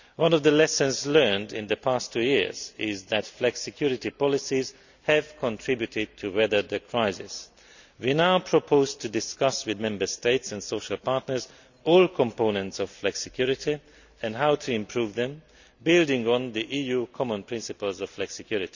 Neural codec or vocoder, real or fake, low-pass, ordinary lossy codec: none; real; 7.2 kHz; none